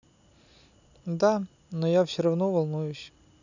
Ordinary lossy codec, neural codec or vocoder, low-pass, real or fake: none; none; 7.2 kHz; real